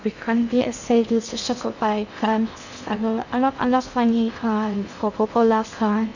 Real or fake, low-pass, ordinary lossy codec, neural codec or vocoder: fake; 7.2 kHz; none; codec, 16 kHz in and 24 kHz out, 0.6 kbps, FocalCodec, streaming, 2048 codes